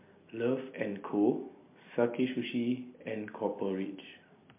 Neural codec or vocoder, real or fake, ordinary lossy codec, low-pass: none; real; MP3, 24 kbps; 3.6 kHz